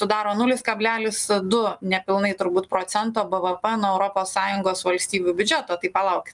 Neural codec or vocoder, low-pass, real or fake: none; 10.8 kHz; real